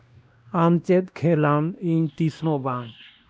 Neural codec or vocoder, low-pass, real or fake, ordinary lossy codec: codec, 16 kHz, 1 kbps, X-Codec, WavLM features, trained on Multilingual LibriSpeech; none; fake; none